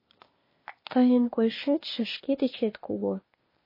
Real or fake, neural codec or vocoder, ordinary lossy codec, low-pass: fake; codec, 16 kHz, 0.8 kbps, ZipCodec; MP3, 24 kbps; 5.4 kHz